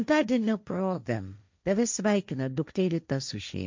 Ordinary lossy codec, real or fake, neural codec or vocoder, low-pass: MP3, 64 kbps; fake; codec, 16 kHz, 1.1 kbps, Voila-Tokenizer; 7.2 kHz